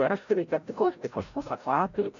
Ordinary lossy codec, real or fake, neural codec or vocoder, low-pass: MP3, 96 kbps; fake; codec, 16 kHz, 0.5 kbps, FreqCodec, larger model; 7.2 kHz